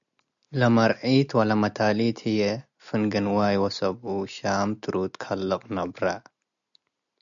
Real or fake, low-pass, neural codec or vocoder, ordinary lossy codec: real; 7.2 kHz; none; MP3, 96 kbps